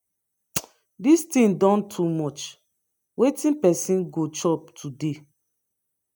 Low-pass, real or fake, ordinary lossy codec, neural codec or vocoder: none; real; none; none